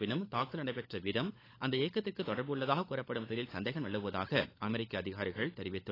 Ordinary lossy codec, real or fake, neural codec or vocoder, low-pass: AAC, 24 kbps; fake; codec, 16 kHz, 16 kbps, FunCodec, trained on LibriTTS, 50 frames a second; 5.4 kHz